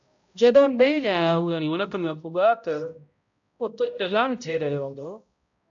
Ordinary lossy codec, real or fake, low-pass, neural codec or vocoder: AAC, 64 kbps; fake; 7.2 kHz; codec, 16 kHz, 0.5 kbps, X-Codec, HuBERT features, trained on balanced general audio